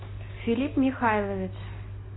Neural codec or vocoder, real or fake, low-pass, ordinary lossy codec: none; real; 7.2 kHz; AAC, 16 kbps